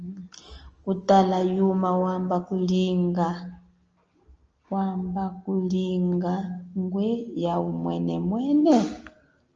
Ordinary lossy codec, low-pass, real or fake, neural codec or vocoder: Opus, 24 kbps; 7.2 kHz; real; none